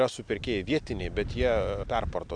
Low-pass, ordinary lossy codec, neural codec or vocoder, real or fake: 9.9 kHz; AAC, 64 kbps; none; real